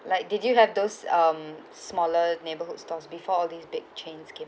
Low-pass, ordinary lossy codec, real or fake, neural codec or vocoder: none; none; real; none